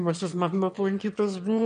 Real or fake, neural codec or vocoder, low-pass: fake; autoencoder, 22.05 kHz, a latent of 192 numbers a frame, VITS, trained on one speaker; 9.9 kHz